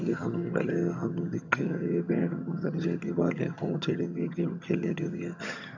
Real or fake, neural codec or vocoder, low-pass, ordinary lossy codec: fake; vocoder, 22.05 kHz, 80 mel bands, HiFi-GAN; 7.2 kHz; none